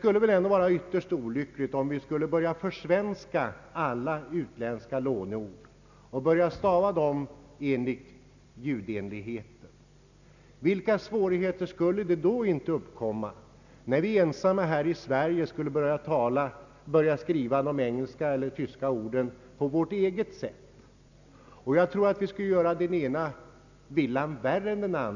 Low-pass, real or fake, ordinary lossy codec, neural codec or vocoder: 7.2 kHz; real; none; none